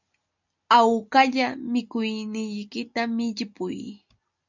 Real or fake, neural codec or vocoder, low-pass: real; none; 7.2 kHz